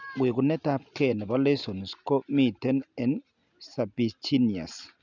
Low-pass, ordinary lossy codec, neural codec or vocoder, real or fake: 7.2 kHz; none; none; real